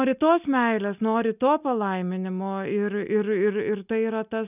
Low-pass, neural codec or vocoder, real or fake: 3.6 kHz; none; real